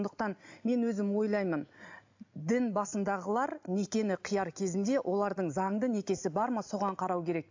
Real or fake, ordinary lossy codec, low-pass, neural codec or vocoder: real; AAC, 48 kbps; 7.2 kHz; none